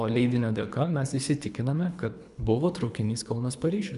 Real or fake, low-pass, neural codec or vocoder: fake; 10.8 kHz; codec, 24 kHz, 3 kbps, HILCodec